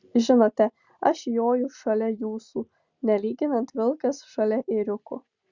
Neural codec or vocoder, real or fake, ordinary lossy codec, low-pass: none; real; Opus, 64 kbps; 7.2 kHz